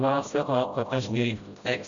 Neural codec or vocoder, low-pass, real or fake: codec, 16 kHz, 0.5 kbps, FreqCodec, smaller model; 7.2 kHz; fake